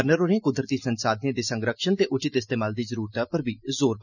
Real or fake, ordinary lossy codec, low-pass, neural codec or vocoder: real; none; 7.2 kHz; none